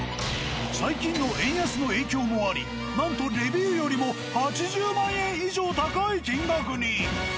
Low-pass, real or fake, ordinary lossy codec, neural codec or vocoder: none; real; none; none